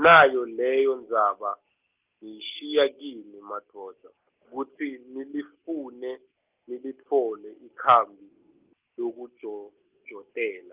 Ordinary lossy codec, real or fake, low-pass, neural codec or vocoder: Opus, 24 kbps; real; 3.6 kHz; none